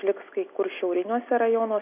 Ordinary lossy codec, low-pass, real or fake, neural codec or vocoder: AAC, 32 kbps; 3.6 kHz; real; none